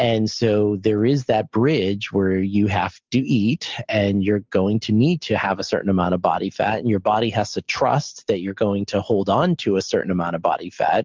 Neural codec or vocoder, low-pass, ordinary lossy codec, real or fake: none; 7.2 kHz; Opus, 24 kbps; real